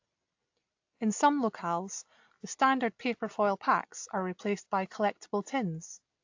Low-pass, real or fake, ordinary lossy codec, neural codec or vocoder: 7.2 kHz; real; AAC, 48 kbps; none